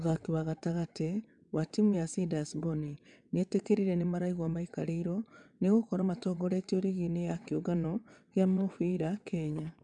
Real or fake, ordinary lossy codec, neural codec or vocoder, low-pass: fake; none; vocoder, 22.05 kHz, 80 mel bands, WaveNeXt; 9.9 kHz